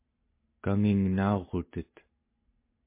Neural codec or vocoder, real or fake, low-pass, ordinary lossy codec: none; real; 3.6 kHz; MP3, 24 kbps